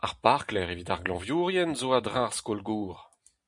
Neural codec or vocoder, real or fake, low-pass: none; real; 10.8 kHz